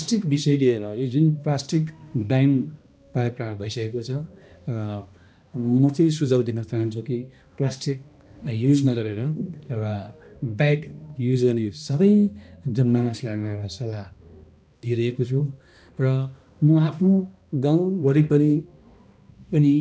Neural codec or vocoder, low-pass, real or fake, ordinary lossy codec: codec, 16 kHz, 1 kbps, X-Codec, HuBERT features, trained on balanced general audio; none; fake; none